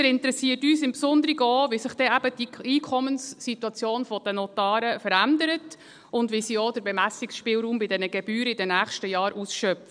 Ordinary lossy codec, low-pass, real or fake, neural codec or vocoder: none; 9.9 kHz; real; none